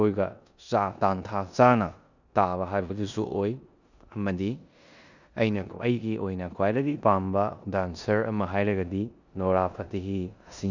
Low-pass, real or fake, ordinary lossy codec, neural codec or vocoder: 7.2 kHz; fake; none; codec, 16 kHz in and 24 kHz out, 0.9 kbps, LongCat-Audio-Codec, four codebook decoder